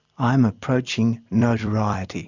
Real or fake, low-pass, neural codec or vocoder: fake; 7.2 kHz; vocoder, 44.1 kHz, 128 mel bands every 256 samples, BigVGAN v2